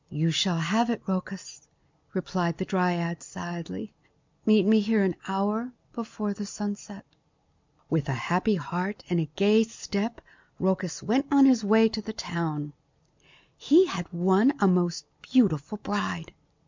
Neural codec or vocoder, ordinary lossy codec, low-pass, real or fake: none; MP3, 64 kbps; 7.2 kHz; real